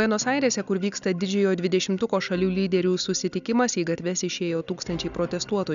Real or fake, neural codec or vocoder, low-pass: real; none; 7.2 kHz